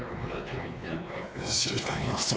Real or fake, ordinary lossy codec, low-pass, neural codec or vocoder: fake; none; none; codec, 16 kHz, 2 kbps, X-Codec, WavLM features, trained on Multilingual LibriSpeech